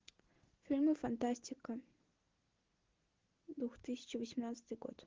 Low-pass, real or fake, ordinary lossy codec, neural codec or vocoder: 7.2 kHz; real; Opus, 16 kbps; none